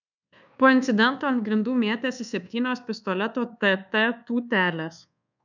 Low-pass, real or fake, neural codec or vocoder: 7.2 kHz; fake; codec, 24 kHz, 1.2 kbps, DualCodec